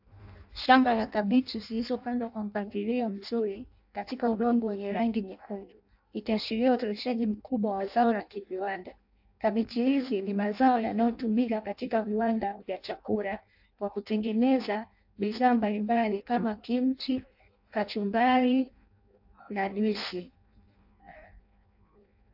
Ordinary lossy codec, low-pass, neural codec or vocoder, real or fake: AAC, 48 kbps; 5.4 kHz; codec, 16 kHz in and 24 kHz out, 0.6 kbps, FireRedTTS-2 codec; fake